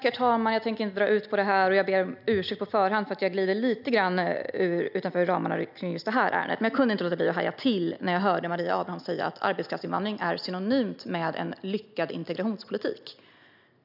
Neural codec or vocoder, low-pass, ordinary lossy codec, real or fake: none; 5.4 kHz; none; real